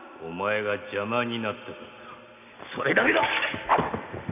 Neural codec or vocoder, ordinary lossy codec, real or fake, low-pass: none; none; real; 3.6 kHz